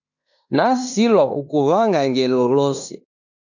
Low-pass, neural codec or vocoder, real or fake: 7.2 kHz; codec, 16 kHz in and 24 kHz out, 0.9 kbps, LongCat-Audio-Codec, fine tuned four codebook decoder; fake